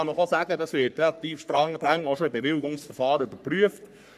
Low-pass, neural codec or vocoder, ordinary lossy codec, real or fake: 14.4 kHz; codec, 44.1 kHz, 3.4 kbps, Pupu-Codec; none; fake